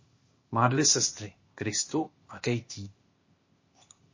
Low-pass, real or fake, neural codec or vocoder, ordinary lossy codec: 7.2 kHz; fake; codec, 16 kHz, 0.8 kbps, ZipCodec; MP3, 32 kbps